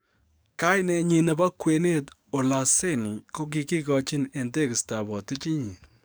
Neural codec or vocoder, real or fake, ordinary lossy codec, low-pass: codec, 44.1 kHz, 7.8 kbps, DAC; fake; none; none